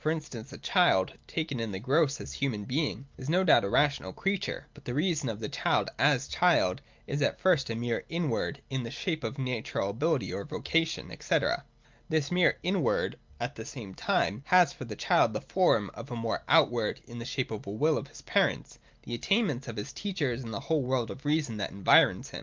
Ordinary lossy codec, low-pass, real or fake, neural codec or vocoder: Opus, 24 kbps; 7.2 kHz; real; none